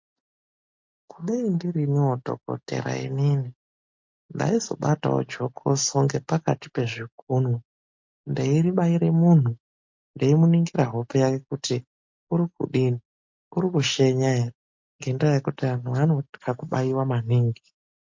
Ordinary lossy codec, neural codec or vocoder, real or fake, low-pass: MP3, 48 kbps; none; real; 7.2 kHz